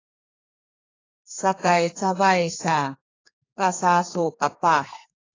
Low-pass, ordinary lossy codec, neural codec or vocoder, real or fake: 7.2 kHz; AAC, 32 kbps; codec, 32 kHz, 1.9 kbps, SNAC; fake